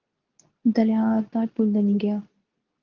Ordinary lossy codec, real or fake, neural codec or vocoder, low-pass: Opus, 32 kbps; fake; codec, 24 kHz, 0.9 kbps, WavTokenizer, medium speech release version 2; 7.2 kHz